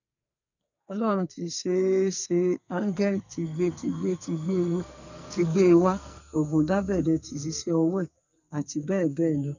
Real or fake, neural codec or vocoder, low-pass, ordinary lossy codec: fake; codec, 44.1 kHz, 2.6 kbps, SNAC; 7.2 kHz; none